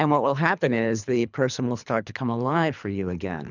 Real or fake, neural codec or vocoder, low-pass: fake; codec, 24 kHz, 3 kbps, HILCodec; 7.2 kHz